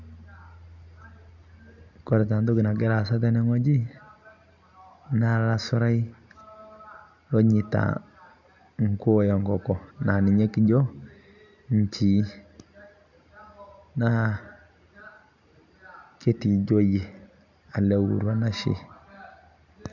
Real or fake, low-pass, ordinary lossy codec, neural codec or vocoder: real; 7.2 kHz; none; none